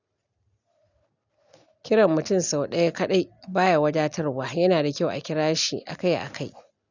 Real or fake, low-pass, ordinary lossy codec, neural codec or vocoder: real; 7.2 kHz; none; none